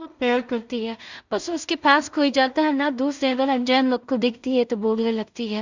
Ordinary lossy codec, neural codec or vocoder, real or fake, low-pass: Opus, 64 kbps; codec, 16 kHz in and 24 kHz out, 0.4 kbps, LongCat-Audio-Codec, two codebook decoder; fake; 7.2 kHz